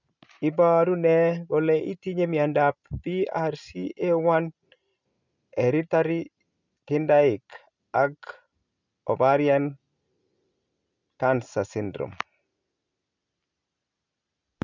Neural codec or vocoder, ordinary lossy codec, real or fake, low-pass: none; none; real; 7.2 kHz